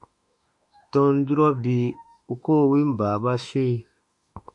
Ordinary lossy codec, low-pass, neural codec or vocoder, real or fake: MP3, 64 kbps; 10.8 kHz; autoencoder, 48 kHz, 32 numbers a frame, DAC-VAE, trained on Japanese speech; fake